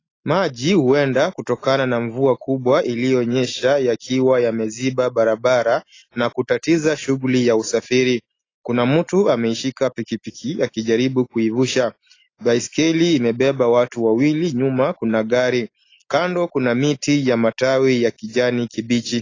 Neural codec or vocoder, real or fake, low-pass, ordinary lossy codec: none; real; 7.2 kHz; AAC, 32 kbps